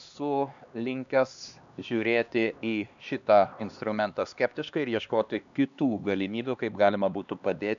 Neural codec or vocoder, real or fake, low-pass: codec, 16 kHz, 2 kbps, X-Codec, HuBERT features, trained on LibriSpeech; fake; 7.2 kHz